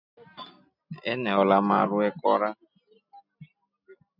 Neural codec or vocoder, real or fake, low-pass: none; real; 5.4 kHz